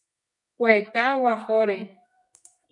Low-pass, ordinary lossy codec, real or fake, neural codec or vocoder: 10.8 kHz; MP3, 96 kbps; fake; codec, 24 kHz, 0.9 kbps, WavTokenizer, medium music audio release